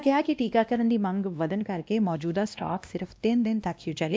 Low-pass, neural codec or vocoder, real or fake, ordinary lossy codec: none; codec, 16 kHz, 1 kbps, X-Codec, WavLM features, trained on Multilingual LibriSpeech; fake; none